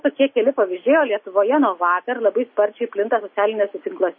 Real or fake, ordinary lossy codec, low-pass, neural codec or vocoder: real; MP3, 24 kbps; 7.2 kHz; none